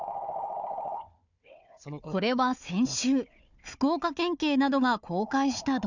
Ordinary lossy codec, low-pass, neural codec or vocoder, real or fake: none; 7.2 kHz; codec, 16 kHz, 4 kbps, FunCodec, trained on Chinese and English, 50 frames a second; fake